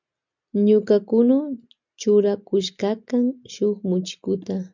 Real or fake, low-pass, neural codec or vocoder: real; 7.2 kHz; none